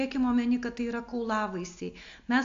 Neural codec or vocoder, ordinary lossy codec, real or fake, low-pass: none; AAC, 48 kbps; real; 7.2 kHz